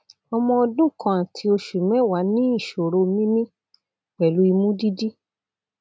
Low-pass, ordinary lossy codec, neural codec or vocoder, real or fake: none; none; none; real